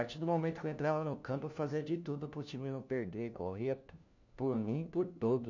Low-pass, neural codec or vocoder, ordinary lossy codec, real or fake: 7.2 kHz; codec, 16 kHz, 1 kbps, FunCodec, trained on LibriTTS, 50 frames a second; none; fake